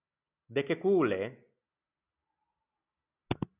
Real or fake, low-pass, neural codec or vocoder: real; 3.6 kHz; none